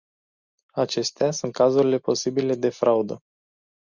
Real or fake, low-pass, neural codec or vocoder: real; 7.2 kHz; none